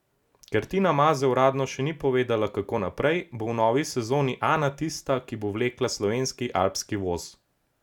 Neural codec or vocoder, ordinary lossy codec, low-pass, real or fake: none; none; 19.8 kHz; real